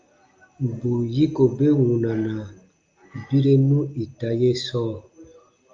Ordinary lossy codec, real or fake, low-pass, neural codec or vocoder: Opus, 32 kbps; real; 7.2 kHz; none